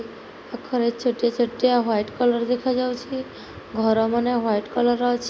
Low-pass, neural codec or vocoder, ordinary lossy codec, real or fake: none; none; none; real